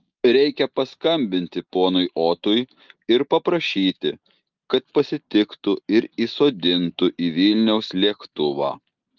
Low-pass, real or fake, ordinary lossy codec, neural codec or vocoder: 7.2 kHz; real; Opus, 32 kbps; none